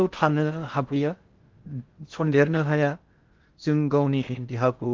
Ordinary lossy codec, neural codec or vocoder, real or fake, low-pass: Opus, 32 kbps; codec, 16 kHz in and 24 kHz out, 0.6 kbps, FocalCodec, streaming, 4096 codes; fake; 7.2 kHz